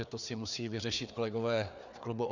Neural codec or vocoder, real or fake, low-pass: codec, 16 kHz, 4 kbps, FreqCodec, larger model; fake; 7.2 kHz